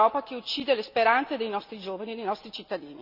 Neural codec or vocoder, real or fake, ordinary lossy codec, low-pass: none; real; none; 5.4 kHz